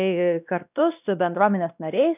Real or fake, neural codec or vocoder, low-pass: fake; codec, 16 kHz, 1 kbps, X-Codec, WavLM features, trained on Multilingual LibriSpeech; 3.6 kHz